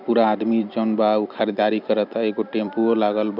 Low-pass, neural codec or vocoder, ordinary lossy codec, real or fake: 5.4 kHz; vocoder, 44.1 kHz, 128 mel bands every 256 samples, BigVGAN v2; none; fake